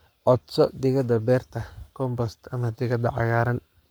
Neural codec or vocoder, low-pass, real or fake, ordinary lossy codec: codec, 44.1 kHz, 7.8 kbps, Pupu-Codec; none; fake; none